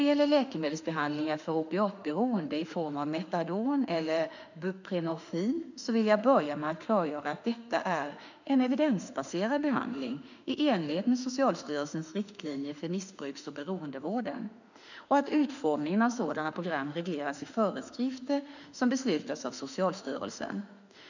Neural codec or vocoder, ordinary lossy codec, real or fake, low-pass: autoencoder, 48 kHz, 32 numbers a frame, DAC-VAE, trained on Japanese speech; none; fake; 7.2 kHz